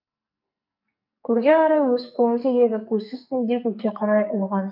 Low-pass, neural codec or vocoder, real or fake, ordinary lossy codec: 5.4 kHz; codec, 44.1 kHz, 2.6 kbps, SNAC; fake; AAC, 48 kbps